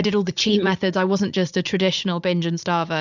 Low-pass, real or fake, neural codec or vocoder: 7.2 kHz; fake; vocoder, 44.1 kHz, 80 mel bands, Vocos